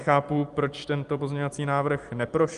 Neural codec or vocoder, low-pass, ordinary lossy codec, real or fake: none; 10.8 kHz; Opus, 32 kbps; real